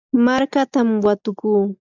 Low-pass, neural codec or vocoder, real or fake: 7.2 kHz; none; real